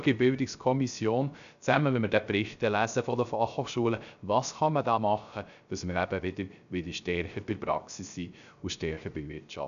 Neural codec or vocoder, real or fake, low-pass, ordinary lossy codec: codec, 16 kHz, 0.3 kbps, FocalCodec; fake; 7.2 kHz; none